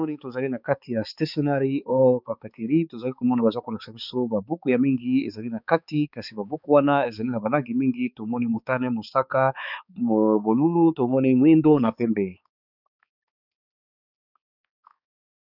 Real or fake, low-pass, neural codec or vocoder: fake; 5.4 kHz; codec, 16 kHz, 4 kbps, X-Codec, HuBERT features, trained on balanced general audio